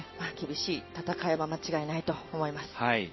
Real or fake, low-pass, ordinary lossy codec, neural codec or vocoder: real; 7.2 kHz; MP3, 24 kbps; none